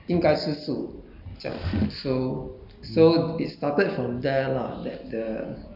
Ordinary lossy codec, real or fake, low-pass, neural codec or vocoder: none; real; 5.4 kHz; none